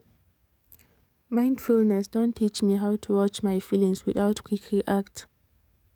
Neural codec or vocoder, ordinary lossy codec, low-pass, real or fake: codec, 44.1 kHz, 7.8 kbps, DAC; none; 19.8 kHz; fake